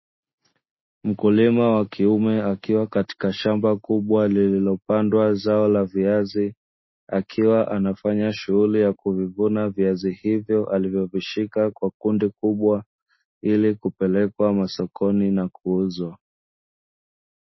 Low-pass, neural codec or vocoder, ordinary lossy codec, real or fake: 7.2 kHz; none; MP3, 24 kbps; real